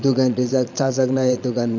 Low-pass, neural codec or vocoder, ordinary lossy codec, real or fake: 7.2 kHz; vocoder, 22.05 kHz, 80 mel bands, Vocos; none; fake